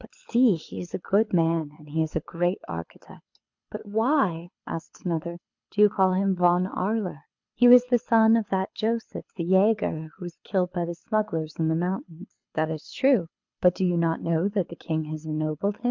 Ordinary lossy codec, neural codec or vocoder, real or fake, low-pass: MP3, 64 kbps; codec, 24 kHz, 6 kbps, HILCodec; fake; 7.2 kHz